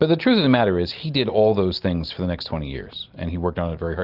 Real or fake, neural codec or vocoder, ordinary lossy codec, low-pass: real; none; Opus, 32 kbps; 5.4 kHz